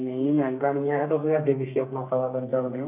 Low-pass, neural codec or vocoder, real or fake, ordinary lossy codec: 3.6 kHz; codec, 32 kHz, 1.9 kbps, SNAC; fake; none